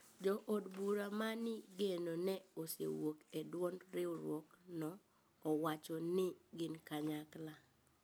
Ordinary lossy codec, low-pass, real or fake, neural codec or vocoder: none; none; real; none